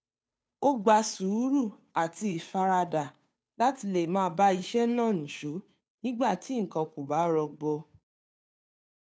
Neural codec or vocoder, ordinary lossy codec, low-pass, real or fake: codec, 16 kHz, 2 kbps, FunCodec, trained on Chinese and English, 25 frames a second; none; none; fake